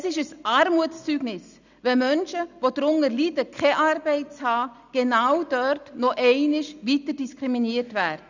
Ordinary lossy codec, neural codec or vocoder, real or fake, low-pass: none; none; real; 7.2 kHz